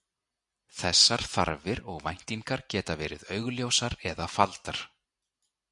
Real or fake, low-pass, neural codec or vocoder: real; 10.8 kHz; none